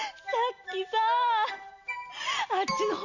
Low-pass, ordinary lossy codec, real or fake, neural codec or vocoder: 7.2 kHz; none; real; none